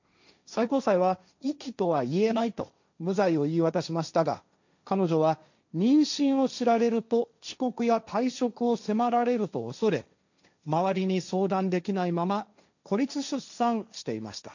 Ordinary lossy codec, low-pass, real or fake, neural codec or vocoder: none; 7.2 kHz; fake; codec, 16 kHz, 1.1 kbps, Voila-Tokenizer